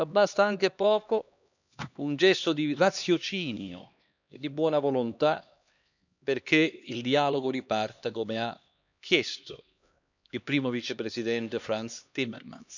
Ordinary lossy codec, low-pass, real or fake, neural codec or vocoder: none; 7.2 kHz; fake; codec, 16 kHz, 2 kbps, X-Codec, HuBERT features, trained on LibriSpeech